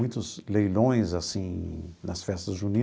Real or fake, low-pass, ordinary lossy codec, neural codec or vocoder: real; none; none; none